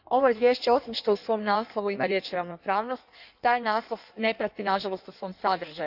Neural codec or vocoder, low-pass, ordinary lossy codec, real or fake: codec, 16 kHz in and 24 kHz out, 1.1 kbps, FireRedTTS-2 codec; 5.4 kHz; none; fake